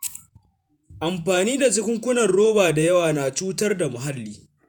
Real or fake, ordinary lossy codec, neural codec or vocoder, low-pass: fake; none; vocoder, 48 kHz, 128 mel bands, Vocos; none